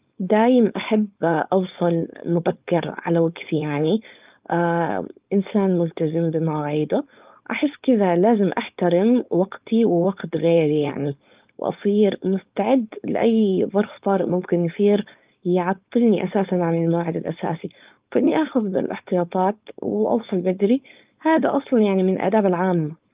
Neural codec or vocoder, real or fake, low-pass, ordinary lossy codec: codec, 16 kHz, 4.8 kbps, FACodec; fake; 3.6 kHz; Opus, 32 kbps